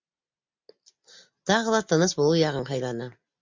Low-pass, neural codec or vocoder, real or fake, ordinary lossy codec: 7.2 kHz; none; real; MP3, 64 kbps